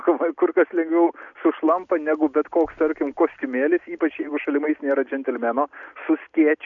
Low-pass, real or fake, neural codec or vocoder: 7.2 kHz; real; none